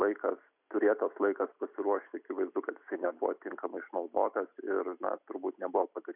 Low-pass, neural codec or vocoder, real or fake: 3.6 kHz; none; real